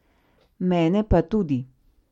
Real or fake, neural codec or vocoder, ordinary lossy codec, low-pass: real; none; MP3, 64 kbps; 19.8 kHz